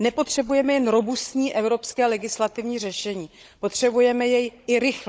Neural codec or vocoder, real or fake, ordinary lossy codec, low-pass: codec, 16 kHz, 16 kbps, FunCodec, trained on Chinese and English, 50 frames a second; fake; none; none